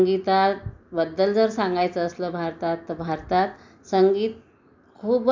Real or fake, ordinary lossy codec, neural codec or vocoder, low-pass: real; MP3, 64 kbps; none; 7.2 kHz